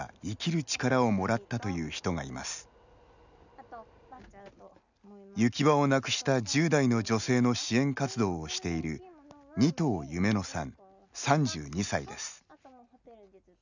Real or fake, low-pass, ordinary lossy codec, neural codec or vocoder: real; 7.2 kHz; none; none